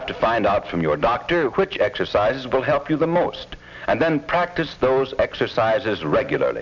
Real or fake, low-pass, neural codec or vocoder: real; 7.2 kHz; none